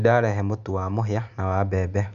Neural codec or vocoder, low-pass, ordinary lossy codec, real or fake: none; 7.2 kHz; none; real